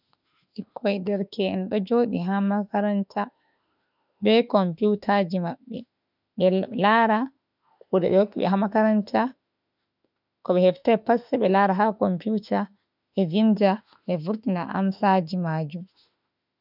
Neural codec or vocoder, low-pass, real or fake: autoencoder, 48 kHz, 32 numbers a frame, DAC-VAE, trained on Japanese speech; 5.4 kHz; fake